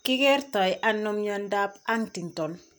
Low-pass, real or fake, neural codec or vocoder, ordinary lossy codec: none; real; none; none